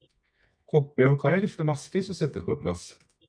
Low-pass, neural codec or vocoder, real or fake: 9.9 kHz; codec, 24 kHz, 0.9 kbps, WavTokenizer, medium music audio release; fake